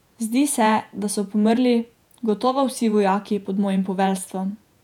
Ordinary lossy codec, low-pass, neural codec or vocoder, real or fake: none; 19.8 kHz; vocoder, 48 kHz, 128 mel bands, Vocos; fake